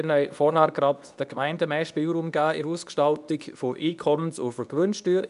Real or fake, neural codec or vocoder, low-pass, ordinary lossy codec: fake; codec, 24 kHz, 0.9 kbps, WavTokenizer, medium speech release version 2; 10.8 kHz; none